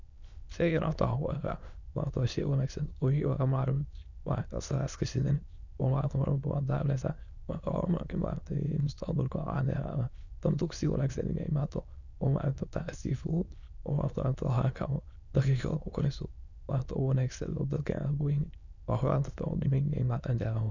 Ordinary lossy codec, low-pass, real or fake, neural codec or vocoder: none; 7.2 kHz; fake; autoencoder, 22.05 kHz, a latent of 192 numbers a frame, VITS, trained on many speakers